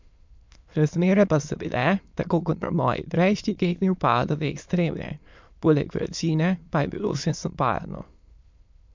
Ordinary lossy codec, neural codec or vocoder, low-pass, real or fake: MP3, 64 kbps; autoencoder, 22.05 kHz, a latent of 192 numbers a frame, VITS, trained on many speakers; 7.2 kHz; fake